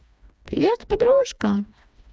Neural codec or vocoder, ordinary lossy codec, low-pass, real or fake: codec, 16 kHz, 2 kbps, FreqCodec, smaller model; none; none; fake